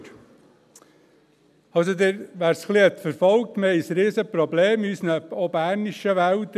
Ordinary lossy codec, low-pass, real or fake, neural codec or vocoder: none; 14.4 kHz; real; none